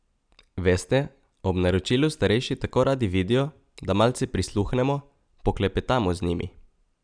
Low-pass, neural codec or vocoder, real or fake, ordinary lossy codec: 9.9 kHz; none; real; none